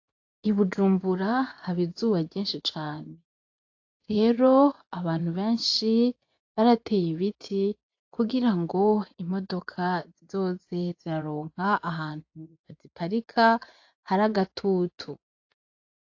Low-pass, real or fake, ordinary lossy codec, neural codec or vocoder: 7.2 kHz; fake; AAC, 48 kbps; vocoder, 24 kHz, 100 mel bands, Vocos